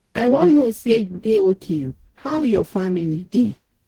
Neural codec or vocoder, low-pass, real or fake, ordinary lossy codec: codec, 44.1 kHz, 0.9 kbps, DAC; 19.8 kHz; fake; Opus, 16 kbps